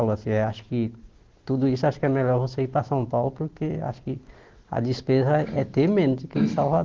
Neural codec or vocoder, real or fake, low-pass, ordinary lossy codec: none; real; 7.2 kHz; Opus, 16 kbps